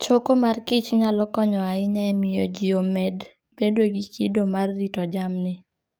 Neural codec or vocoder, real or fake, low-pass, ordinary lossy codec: codec, 44.1 kHz, 7.8 kbps, DAC; fake; none; none